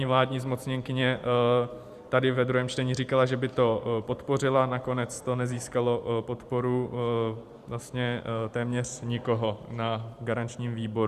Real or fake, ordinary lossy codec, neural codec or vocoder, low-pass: fake; Opus, 64 kbps; vocoder, 44.1 kHz, 128 mel bands every 512 samples, BigVGAN v2; 14.4 kHz